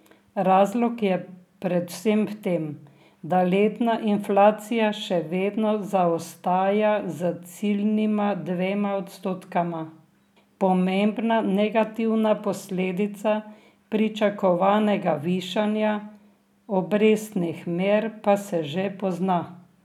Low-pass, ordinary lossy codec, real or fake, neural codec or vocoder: 19.8 kHz; none; real; none